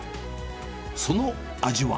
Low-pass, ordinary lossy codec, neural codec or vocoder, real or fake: none; none; none; real